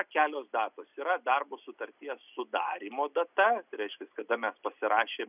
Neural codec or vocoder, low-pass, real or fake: none; 3.6 kHz; real